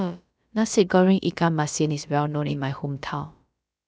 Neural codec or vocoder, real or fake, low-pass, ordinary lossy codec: codec, 16 kHz, about 1 kbps, DyCAST, with the encoder's durations; fake; none; none